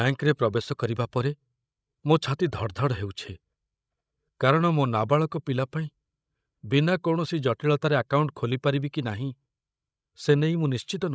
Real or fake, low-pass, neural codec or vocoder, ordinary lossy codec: fake; none; codec, 16 kHz, 16 kbps, FreqCodec, larger model; none